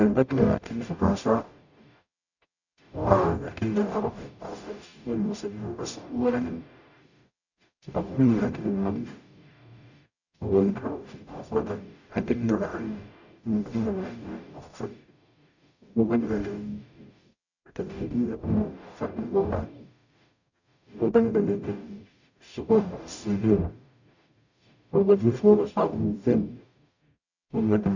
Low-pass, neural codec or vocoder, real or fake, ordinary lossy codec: 7.2 kHz; codec, 44.1 kHz, 0.9 kbps, DAC; fake; none